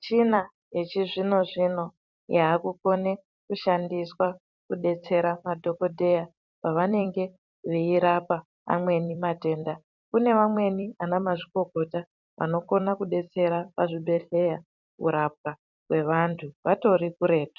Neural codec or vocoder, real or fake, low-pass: vocoder, 44.1 kHz, 128 mel bands every 256 samples, BigVGAN v2; fake; 7.2 kHz